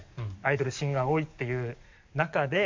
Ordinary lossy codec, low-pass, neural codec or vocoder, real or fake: MP3, 64 kbps; 7.2 kHz; codec, 44.1 kHz, 7.8 kbps, DAC; fake